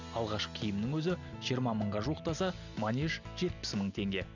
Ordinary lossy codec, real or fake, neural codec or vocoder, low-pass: none; real; none; 7.2 kHz